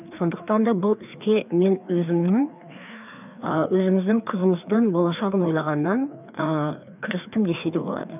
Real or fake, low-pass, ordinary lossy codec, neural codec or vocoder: fake; 3.6 kHz; none; codec, 16 kHz, 2 kbps, FreqCodec, larger model